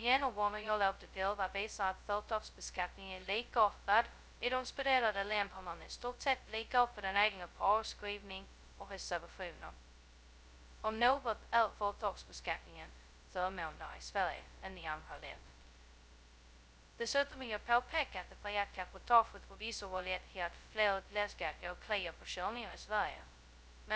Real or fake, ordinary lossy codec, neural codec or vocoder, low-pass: fake; none; codec, 16 kHz, 0.2 kbps, FocalCodec; none